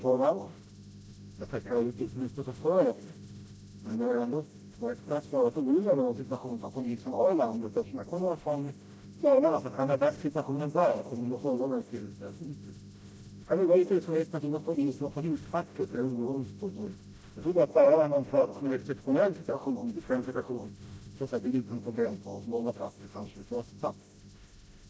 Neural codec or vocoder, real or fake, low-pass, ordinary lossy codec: codec, 16 kHz, 0.5 kbps, FreqCodec, smaller model; fake; none; none